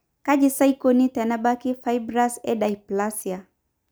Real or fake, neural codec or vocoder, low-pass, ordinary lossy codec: real; none; none; none